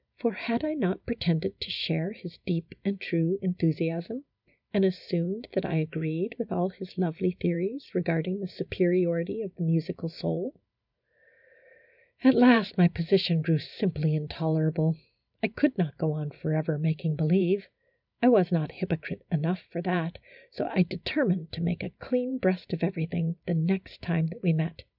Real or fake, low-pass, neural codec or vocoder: fake; 5.4 kHz; vocoder, 44.1 kHz, 128 mel bands every 512 samples, BigVGAN v2